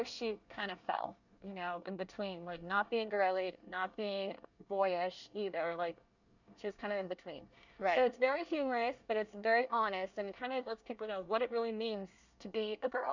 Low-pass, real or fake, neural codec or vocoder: 7.2 kHz; fake; codec, 24 kHz, 1 kbps, SNAC